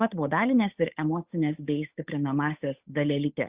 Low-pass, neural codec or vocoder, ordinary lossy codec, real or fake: 3.6 kHz; codec, 16 kHz, 8 kbps, FunCodec, trained on Chinese and English, 25 frames a second; Opus, 64 kbps; fake